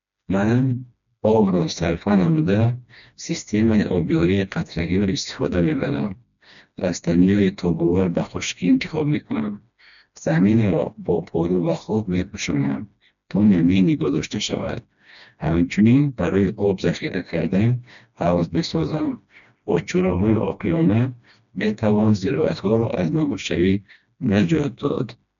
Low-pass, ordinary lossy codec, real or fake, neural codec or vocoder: 7.2 kHz; none; fake; codec, 16 kHz, 1 kbps, FreqCodec, smaller model